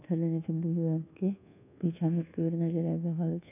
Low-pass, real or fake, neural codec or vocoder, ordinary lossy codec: 3.6 kHz; fake; codec, 24 kHz, 1.2 kbps, DualCodec; none